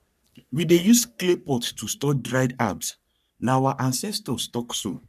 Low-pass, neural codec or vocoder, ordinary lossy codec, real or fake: 14.4 kHz; codec, 44.1 kHz, 3.4 kbps, Pupu-Codec; none; fake